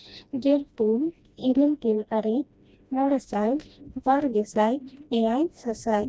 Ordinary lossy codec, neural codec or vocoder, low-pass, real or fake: none; codec, 16 kHz, 1 kbps, FreqCodec, smaller model; none; fake